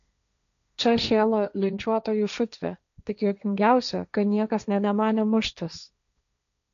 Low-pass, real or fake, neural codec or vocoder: 7.2 kHz; fake; codec, 16 kHz, 1.1 kbps, Voila-Tokenizer